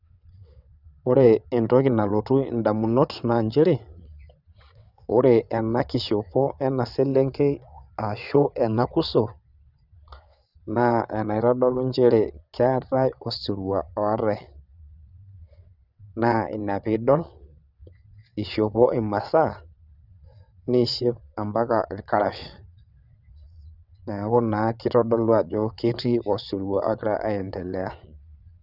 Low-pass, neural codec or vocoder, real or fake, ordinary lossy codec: 5.4 kHz; vocoder, 22.05 kHz, 80 mel bands, WaveNeXt; fake; none